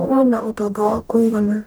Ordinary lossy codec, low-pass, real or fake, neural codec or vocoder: none; none; fake; codec, 44.1 kHz, 0.9 kbps, DAC